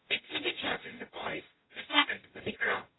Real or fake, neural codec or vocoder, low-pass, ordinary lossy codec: fake; codec, 44.1 kHz, 0.9 kbps, DAC; 7.2 kHz; AAC, 16 kbps